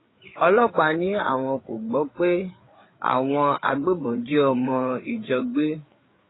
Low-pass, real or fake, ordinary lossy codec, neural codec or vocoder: 7.2 kHz; fake; AAC, 16 kbps; codec, 16 kHz, 4 kbps, FreqCodec, larger model